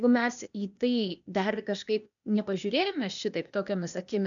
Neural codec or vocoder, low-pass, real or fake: codec, 16 kHz, 0.8 kbps, ZipCodec; 7.2 kHz; fake